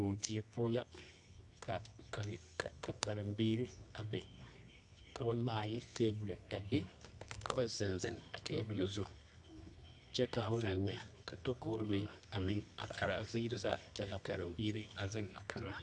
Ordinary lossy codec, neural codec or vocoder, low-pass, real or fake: AAC, 64 kbps; codec, 24 kHz, 0.9 kbps, WavTokenizer, medium music audio release; 10.8 kHz; fake